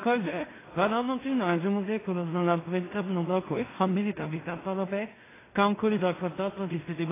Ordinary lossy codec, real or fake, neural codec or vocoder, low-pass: AAC, 16 kbps; fake; codec, 16 kHz in and 24 kHz out, 0.4 kbps, LongCat-Audio-Codec, two codebook decoder; 3.6 kHz